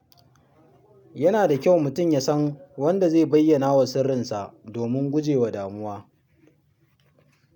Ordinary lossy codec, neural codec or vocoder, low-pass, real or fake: none; none; 19.8 kHz; real